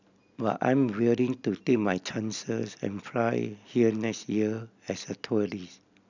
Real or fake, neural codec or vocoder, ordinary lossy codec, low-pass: real; none; none; 7.2 kHz